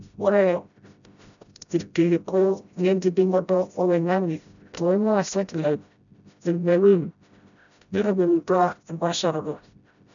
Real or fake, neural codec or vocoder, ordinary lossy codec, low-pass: fake; codec, 16 kHz, 0.5 kbps, FreqCodec, smaller model; none; 7.2 kHz